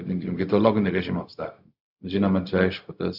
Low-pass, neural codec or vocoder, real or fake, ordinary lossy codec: 5.4 kHz; codec, 16 kHz, 0.4 kbps, LongCat-Audio-Codec; fake; Opus, 64 kbps